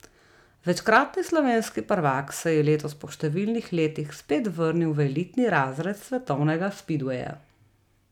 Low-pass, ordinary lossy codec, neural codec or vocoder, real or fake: 19.8 kHz; none; none; real